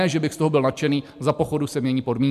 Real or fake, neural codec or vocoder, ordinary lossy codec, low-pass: real; none; MP3, 96 kbps; 14.4 kHz